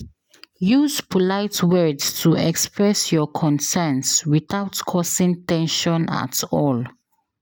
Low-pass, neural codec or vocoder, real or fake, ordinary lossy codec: none; none; real; none